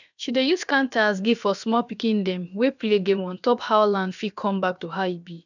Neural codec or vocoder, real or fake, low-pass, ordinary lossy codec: codec, 16 kHz, about 1 kbps, DyCAST, with the encoder's durations; fake; 7.2 kHz; none